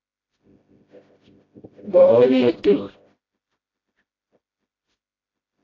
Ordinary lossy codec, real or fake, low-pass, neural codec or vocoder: AAC, 32 kbps; fake; 7.2 kHz; codec, 16 kHz, 0.5 kbps, FreqCodec, smaller model